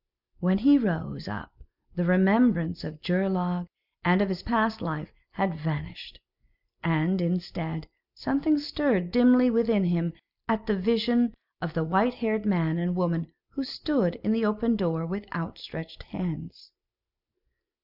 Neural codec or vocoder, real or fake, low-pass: none; real; 5.4 kHz